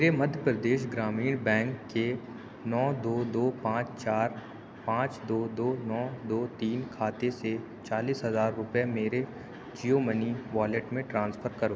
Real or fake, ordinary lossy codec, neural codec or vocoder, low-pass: real; none; none; none